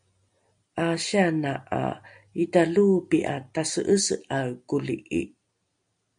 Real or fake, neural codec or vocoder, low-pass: real; none; 9.9 kHz